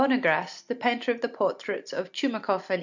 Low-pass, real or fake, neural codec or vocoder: 7.2 kHz; real; none